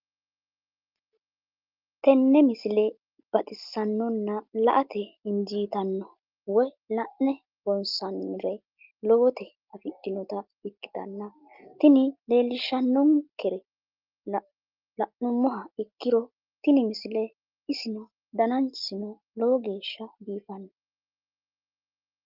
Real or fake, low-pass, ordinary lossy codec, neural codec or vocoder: real; 5.4 kHz; Opus, 24 kbps; none